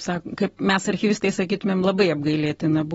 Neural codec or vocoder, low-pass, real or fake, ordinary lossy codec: none; 19.8 kHz; real; AAC, 24 kbps